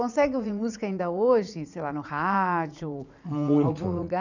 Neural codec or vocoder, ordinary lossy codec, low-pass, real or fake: vocoder, 44.1 kHz, 80 mel bands, Vocos; none; 7.2 kHz; fake